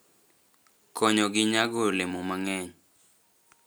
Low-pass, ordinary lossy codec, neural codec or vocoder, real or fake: none; none; none; real